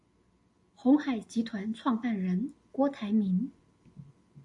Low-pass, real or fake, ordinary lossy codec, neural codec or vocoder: 10.8 kHz; real; AAC, 64 kbps; none